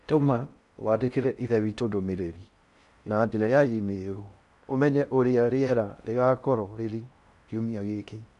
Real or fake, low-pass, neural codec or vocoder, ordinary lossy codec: fake; 10.8 kHz; codec, 16 kHz in and 24 kHz out, 0.6 kbps, FocalCodec, streaming, 4096 codes; none